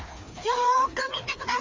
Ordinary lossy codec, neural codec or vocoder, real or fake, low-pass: Opus, 32 kbps; codec, 16 kHz, 2 kbps, FreqCodec, larger model; fake; 7.2 kHz